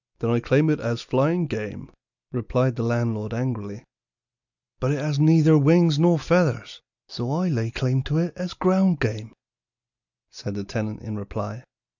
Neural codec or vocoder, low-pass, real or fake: none; 7.2 kHz; real